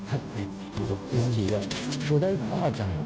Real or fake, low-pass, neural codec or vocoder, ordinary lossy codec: fake; none; codec, 16 kHz, 0.5 kbps, FunCodec, trained on Chinese and English, 25 frames a second; none